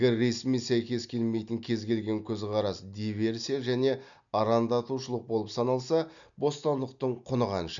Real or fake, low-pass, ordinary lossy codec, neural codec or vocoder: real; 7.2 kHz; none; none